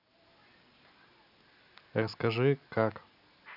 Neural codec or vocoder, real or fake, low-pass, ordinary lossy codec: none; real; 5.4 kHz; none